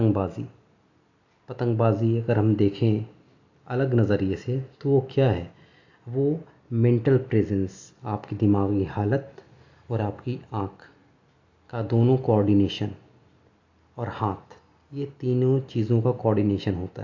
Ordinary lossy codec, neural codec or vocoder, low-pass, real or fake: AAC, 48 kbps; none; 7.2 kHz; real